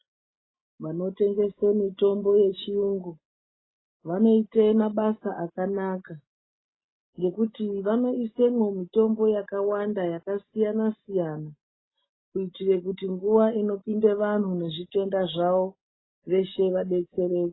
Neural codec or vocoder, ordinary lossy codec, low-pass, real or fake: none; AAC, 16 kbps; 7.2 kHz; real